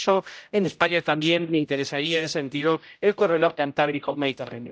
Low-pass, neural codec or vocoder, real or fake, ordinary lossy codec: none; codec, 16 kHz, 0.5 kbps, X-Codec, HuBERT features, trained on general audio; fake; none